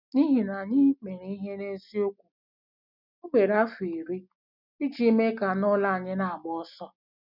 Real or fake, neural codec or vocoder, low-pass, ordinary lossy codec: real; none; 5.4 kHz; none